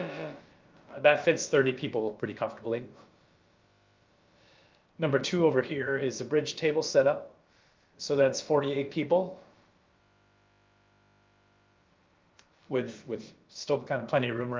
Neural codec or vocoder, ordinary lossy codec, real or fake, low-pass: codec, 16 kHz, about 1 kbps, DyCAST, with the encoder's durations; Opus, 24 kbps; fake; 7.2 kHz